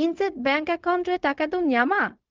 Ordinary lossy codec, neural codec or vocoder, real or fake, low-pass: Opus, 24 kbps; codec, 16 kHz, 0.4 kbps, LongCat-Audio-Codec; fake; 7.2 kHz